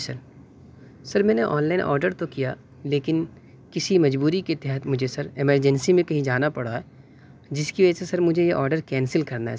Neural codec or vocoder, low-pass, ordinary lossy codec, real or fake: none; none; none; real